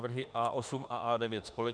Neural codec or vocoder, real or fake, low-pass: autoencoder, 48 kHz, 32 numbers a frame, DAC-VAE, trained on Japanese speech; fake; 9.9 kHz